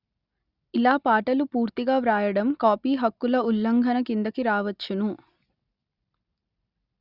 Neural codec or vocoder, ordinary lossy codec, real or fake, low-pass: none; Opus, 64 kbps; real; 5.4 kHz